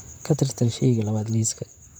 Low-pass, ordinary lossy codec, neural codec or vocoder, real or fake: none; none; vocoder, 44.1 kHz, 128 mel bands, Pupu-Vocoder; fake